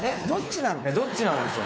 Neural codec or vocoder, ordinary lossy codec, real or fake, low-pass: codec, 16 kHz, 4 kbps, X-Codec, WavLM features, trained on Multilingual LibriSpeech; none; fake; none